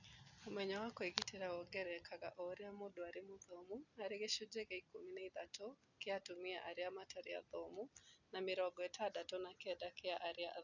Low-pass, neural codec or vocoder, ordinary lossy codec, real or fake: 7.2 kHz; none; none; real